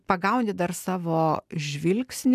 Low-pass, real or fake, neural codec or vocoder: 14.4 kHz; real; none